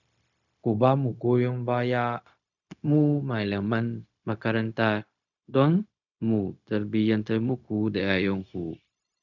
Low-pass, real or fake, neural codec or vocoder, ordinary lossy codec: 7.2 kHz; fake; codec, 16 kHz, 0.4 kbps, LongCat-Audio-Codec; none